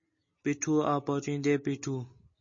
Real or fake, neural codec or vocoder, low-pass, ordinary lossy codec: real; none; 7.2 kHz; MP3, 32 kbps